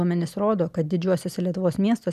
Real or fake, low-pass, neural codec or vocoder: real; 14.4 kHz; none